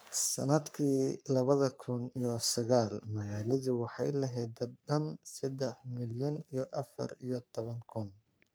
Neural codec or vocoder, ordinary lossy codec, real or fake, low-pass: codec, 44.1 kHz, 3.4 kbps, Pupu-Codec; none; fake; none